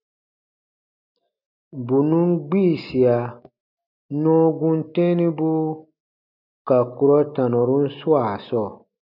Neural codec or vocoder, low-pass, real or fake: none; 5.4 kHz; real